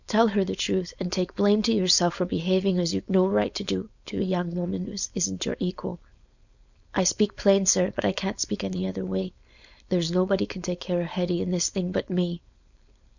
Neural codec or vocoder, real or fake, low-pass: codec, 16 kHz, 4.8 kbps, FACodec; fake; 7.2 kHz